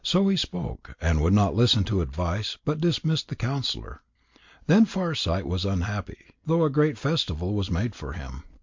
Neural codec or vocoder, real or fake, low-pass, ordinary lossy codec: none; real; 7.2 kHz; MP3, 48 kbps